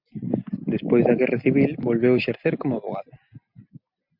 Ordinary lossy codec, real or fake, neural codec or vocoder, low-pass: MP3, 48 kbps; real; none; 5.4 kHz